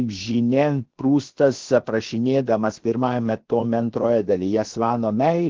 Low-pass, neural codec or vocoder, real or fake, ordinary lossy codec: 7.2 kHz; codec, 16 kHz, about 1 kbps, DyCAST, with the encoder's durations; fake; Opus, 16 kbps